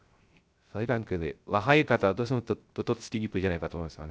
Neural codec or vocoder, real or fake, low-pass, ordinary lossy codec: codec, 16 kHz, 0.3 kbps, FocalCodec; fake; none; none